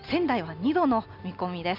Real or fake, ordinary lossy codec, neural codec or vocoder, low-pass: real; none; none; 5.4 kHz